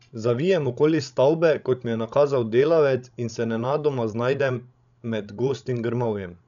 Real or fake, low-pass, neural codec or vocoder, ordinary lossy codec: fake; 7.2 kHz; codec, 16 kHz, 16 kbps, FreqCodec, larger model; none